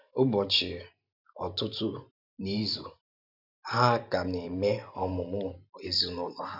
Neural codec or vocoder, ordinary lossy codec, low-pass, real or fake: vocoder, 44.1 kHz, 128 mel bands every 512 samples, BigVGAN v2; none; 5.4 kHz; fake